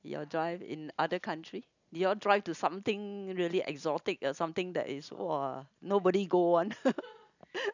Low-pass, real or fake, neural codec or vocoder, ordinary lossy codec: 7.2 kHz; real; none; none